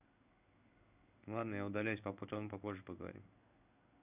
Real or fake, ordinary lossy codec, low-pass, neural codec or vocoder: fake; none; 3.6 kHz; codec, 16 kHz in and 24 kHz out, 1 kbps, XY-Tokenizer